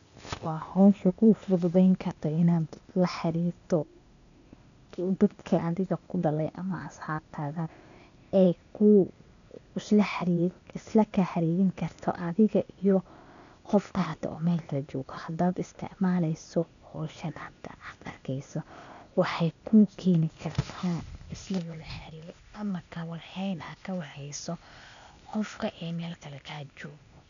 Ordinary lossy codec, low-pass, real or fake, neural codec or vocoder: none; 7.2 kHz; fake; codec, 16 kHz, 0.8 kbps, ZipCodec